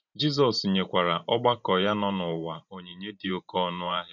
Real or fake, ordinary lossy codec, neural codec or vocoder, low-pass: fake; none; vocoder, 44.1 kHz, 128 mel bands every 512 samples, BigVGAN v2; 7.2 kHz